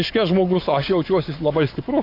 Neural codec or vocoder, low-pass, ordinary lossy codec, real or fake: codec, 44.1 kHz, 7.8 kbps, Pupu-Codec; 5.4 kHz; AAC, 32 kbps; fake